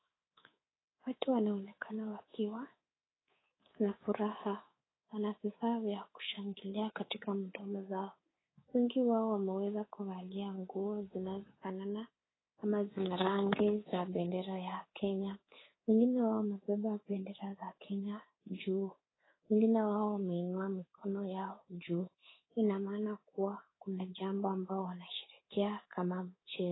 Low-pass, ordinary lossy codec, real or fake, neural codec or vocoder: 7.2 kHz; AAC, 16 kbps; fake; codec, 24 kHz, 3.1 kbps, DualCodec